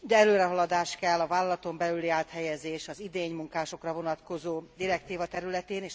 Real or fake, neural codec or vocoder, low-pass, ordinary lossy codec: real; none; none; none